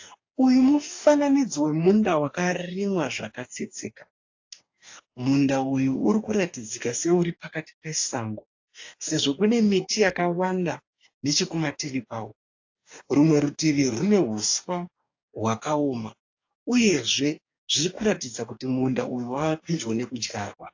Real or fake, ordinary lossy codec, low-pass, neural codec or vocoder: fake; AAC, 48 kbps; 7.2 kHz; codec, 44.1 kHz, 2.6 kbps, DAC